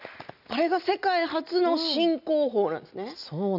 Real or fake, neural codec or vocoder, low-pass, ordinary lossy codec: real; none; 5.4 kHz; none